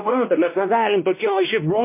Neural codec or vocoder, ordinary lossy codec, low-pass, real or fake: codec, 16 kHz, 1 kbps, X-Codec, WavLM features, trained on Multilingual LibriSpeech; MP3, 24 kbps; 3.6 kHz; fake